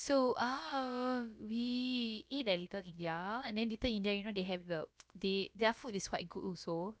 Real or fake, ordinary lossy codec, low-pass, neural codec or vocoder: fake; none; none; codec, 16 kHz, about 1 kbps, DyCAST, with the encoder's durations